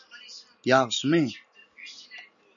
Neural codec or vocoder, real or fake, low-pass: none; real; 7.2 kHz